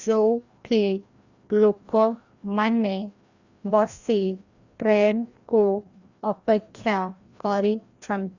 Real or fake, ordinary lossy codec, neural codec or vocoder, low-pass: fake; Opus, 64 kbps; codec, 16 kHz, 1 kbps, FreqCodec, larger model; 7.2 kHz